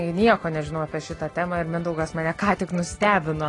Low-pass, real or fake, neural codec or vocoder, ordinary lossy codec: 10.8 kHz; real; none; AAC, 32 kbps